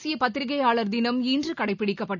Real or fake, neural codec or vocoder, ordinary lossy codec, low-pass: real; none; none; 7.2 kHz